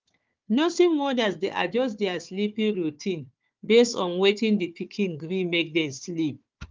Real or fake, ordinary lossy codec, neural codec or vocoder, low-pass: fake; Opus, 32 kbps; codec, 16 kHz, 4 kbps, FunCodec, trained on Chinese and English, 50 frames a second; 7.2 kHz